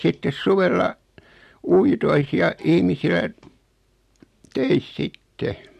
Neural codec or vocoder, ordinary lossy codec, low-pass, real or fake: none; MP3, 64 kbps; 14.4 kHz; real